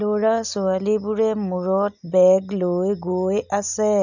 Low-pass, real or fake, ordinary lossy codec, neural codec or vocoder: 7.2 kHz; real; none; none